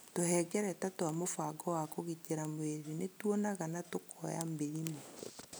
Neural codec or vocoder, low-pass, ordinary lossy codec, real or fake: none; none; none; real